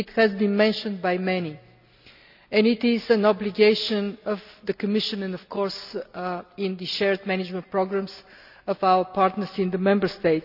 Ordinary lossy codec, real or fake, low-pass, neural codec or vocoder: none; real; 5.4 kHz; none